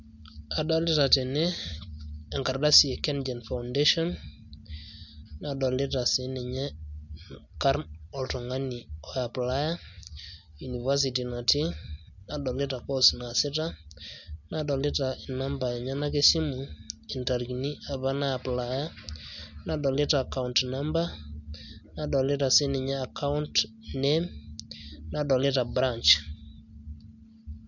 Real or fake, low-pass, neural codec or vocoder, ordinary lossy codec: real; 7.2 kHz; none; none